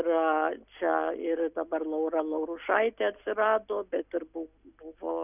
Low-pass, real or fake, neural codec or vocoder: 3.6 kHz; real; none